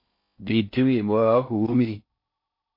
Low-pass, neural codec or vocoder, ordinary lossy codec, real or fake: 5.4 kHz; codec, 16 kHz in and 24 kHz out, 0.6 kbps, FocalCodec, streaming, 4096 codes; MP3, 32 kbps; fake